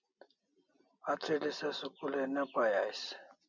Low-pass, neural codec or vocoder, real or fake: 7.2 kHz; none; real